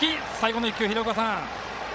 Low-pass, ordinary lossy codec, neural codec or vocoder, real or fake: none; none; codec, 16 kHz, 16 kbps, FreqCodec, larger model; fake